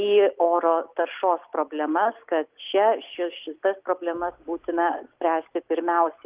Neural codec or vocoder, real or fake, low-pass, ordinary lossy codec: none; real; 3.6 kHz; Opus, 32 kbps